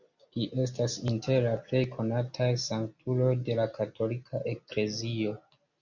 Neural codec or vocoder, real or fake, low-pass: none; real; 7.2 kHz